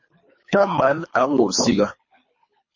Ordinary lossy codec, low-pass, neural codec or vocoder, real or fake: MP3, 32 kbps; 7.2 kHz; codec, 24 kHz, 3 kbps, HILCodec; fake